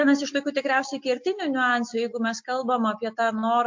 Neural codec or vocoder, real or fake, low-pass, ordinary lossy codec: none; real; 7.2 kHz; MP3, 48 kbps